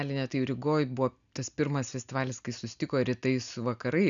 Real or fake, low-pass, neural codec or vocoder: real; 7.2 kHz; none